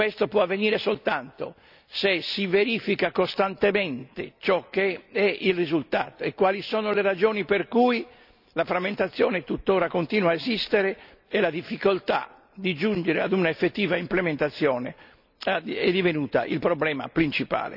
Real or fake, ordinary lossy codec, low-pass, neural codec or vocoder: real; none; 5.4 kHz; none